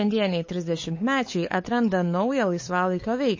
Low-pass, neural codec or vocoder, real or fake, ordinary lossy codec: 7.2 kHz; codec, 16 kHz, 8 kbps, FunCodec, trained on LibriTTS, 25 frames a second; fake; MP3, 32 kbps